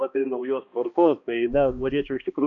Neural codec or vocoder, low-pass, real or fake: codec, 16 kHz, 1 kbps, X-Codec, HuBERT features, trained on balanced general audio; 7.2 kHz; fake